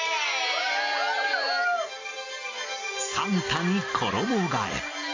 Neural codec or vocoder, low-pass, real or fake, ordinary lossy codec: none; 7.2 kHz; real; AAC, 32 kbps